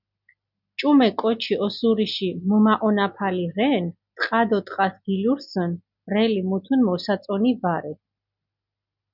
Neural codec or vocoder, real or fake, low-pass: none; real; 5.4 kHz